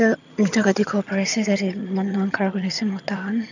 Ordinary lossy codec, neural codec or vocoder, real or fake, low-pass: none; vocoder, 22.05 kHz, 80 mel bands, HiFi-GAN; fake; 7.2 kHz